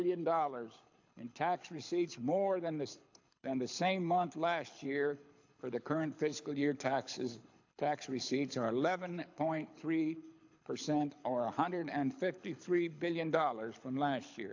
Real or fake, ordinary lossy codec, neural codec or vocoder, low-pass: fake; AAC, 48 kbps; codec, 24 kHz, 6 kbps, HILCodec; 7.2 kHz